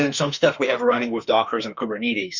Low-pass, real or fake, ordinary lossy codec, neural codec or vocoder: 7.2 kHz; fake; Opus, 64 kbps; autoencoder, 48 kHz, 32 numbers a frame, DAC-VAE, trained on Japanese speech